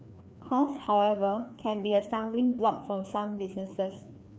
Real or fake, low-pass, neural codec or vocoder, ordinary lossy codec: fake; none; codec, 16 kHz, 2 kbps, FreqCodec, larger model; none